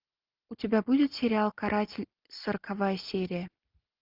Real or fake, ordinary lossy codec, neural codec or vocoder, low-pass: real; Opus, 24 kbps; none; 5.4 kHz